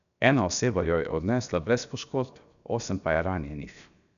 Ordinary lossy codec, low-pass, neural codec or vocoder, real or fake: none; 7.2 kHz; codec, 16 kHz, 0.7 kbps, FocalCodec; fake